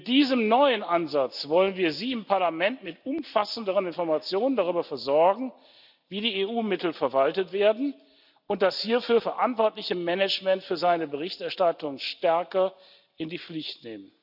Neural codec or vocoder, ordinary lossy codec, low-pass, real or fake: none; none; 5.4 kHz; real